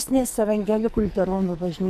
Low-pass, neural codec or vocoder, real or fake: 14.4 kHz; codec, 44.1 kHz, 2.6 kbps, SNAC; fake